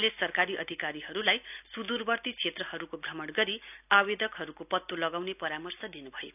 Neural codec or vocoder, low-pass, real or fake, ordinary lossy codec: none; 3.6 kHz; real; none